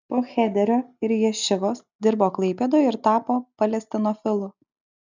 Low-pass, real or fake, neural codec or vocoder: 7.2 kHz; real; none